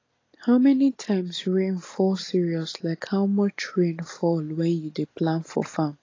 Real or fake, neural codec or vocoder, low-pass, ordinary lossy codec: real; none; 7.2 kHz; AAC, 32 kbps